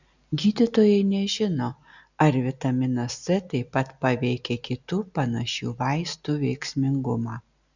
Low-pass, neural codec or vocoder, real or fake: 7.2 kHz; none; real